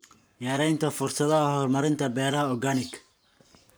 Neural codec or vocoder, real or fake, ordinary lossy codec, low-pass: codec, 44.1 kHz, 7.8 kbps, Pupu-Codec; fake; none; none